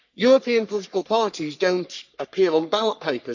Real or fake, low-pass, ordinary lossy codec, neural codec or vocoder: fake; 7.2 kHz; none; codec, 44.1 kHz, 3.4 kbps, Pupu-Codec